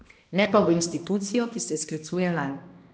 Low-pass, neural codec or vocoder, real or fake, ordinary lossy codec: none; codec, 16 kHz, 1 kbps, X-Codec, HuBERT features, trained on balanced general audio; fake; none